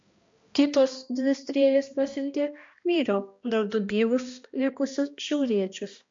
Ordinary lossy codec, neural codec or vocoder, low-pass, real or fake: MP3, 48 kbps; codec, 16 kHz, 1 kbps, X-Codec, HuBERT features, trained on balanced general audio; 7.2 kHz; fake